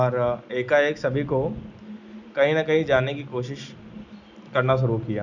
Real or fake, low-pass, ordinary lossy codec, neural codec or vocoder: real; 7.2 kHz; none; none